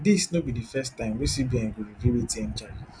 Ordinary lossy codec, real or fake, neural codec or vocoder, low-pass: none; real; none; 9.9 kHz